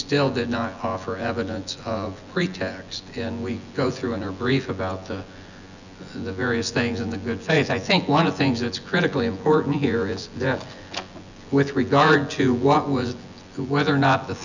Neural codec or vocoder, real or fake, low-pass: vocoder, 24 kHz, 100 mel bands, Vocos; fake; 7.2 kHz